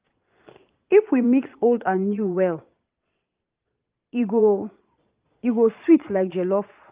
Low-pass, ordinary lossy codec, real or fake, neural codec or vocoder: 3.6 kHz; Opus, 32 kbps; fake; vocoder, 22.05 kHz, 80 mel bands, Vocos